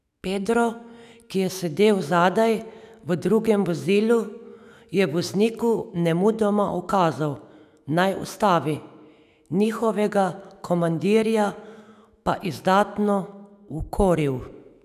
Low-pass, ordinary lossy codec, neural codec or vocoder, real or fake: 14.4 kHz; none; autoencoder, 48 kHz, 128 numbers a frame, DAC-VAE, trained on Japanese speech; fake